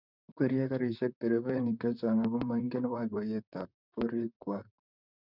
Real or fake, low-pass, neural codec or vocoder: fake; 5.4 kHz; vocoder, 44.1 kHz, 128 mel bands, Pupu-Vocoder